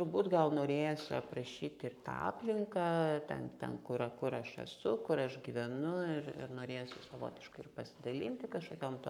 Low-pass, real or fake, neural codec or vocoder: 19.8 kHz; fake; codec, 44.1 kHz, 7.8 kbps, Pupu-Codec